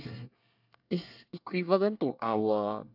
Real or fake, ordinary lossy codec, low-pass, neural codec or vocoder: fake; AAC, 48 kbps; 5.4 kHz; codec, 24 kHz, 1 kbps, SNAC